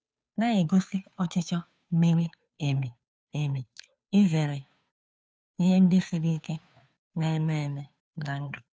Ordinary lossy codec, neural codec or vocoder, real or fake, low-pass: none; codec, 16 kHz, 2 kbps, FunCodec, trained on Chinese and English, 25 frames a second; fake; none